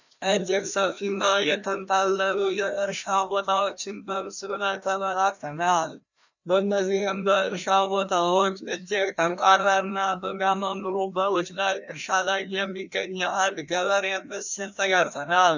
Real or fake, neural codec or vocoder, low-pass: fake; codec, 16 kHz, 1 kbps, FreqCodec, larger model; 7.2 kHz